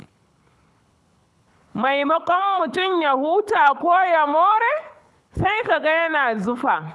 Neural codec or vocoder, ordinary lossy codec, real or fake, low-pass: codec, 24 kHz, 6 kbps, HILCodec; none; fake; none